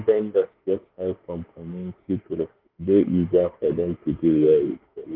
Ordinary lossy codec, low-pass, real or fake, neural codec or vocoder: Opus, 24 kbps; 5.4 kHz; fake; autoencoder, 48 kHz, 32 numbers a frame, DAC-VAE, trained on Japanese speech